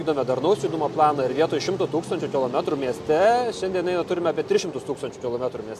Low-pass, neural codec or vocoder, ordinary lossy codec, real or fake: 14.4 kHz; none; MP3, 64 kbps; real